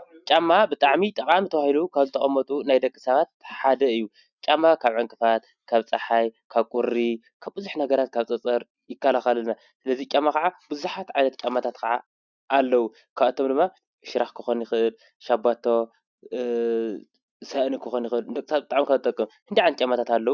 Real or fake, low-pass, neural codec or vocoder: real; 7.2 kHz; none